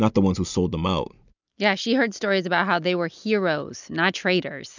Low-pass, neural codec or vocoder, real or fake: 7.2 kHz; none; real